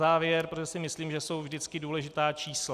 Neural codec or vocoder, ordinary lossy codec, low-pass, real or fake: none; Opus, 64 kbps; 14.4 kHz; real